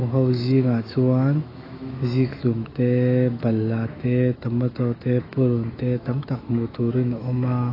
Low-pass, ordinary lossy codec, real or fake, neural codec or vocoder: 5.4 kHz; AAC, 24 kbps; fake; autoencoder, 48 kHz, 128 numbers a frame, DAC-VAE, trained on Japanese speech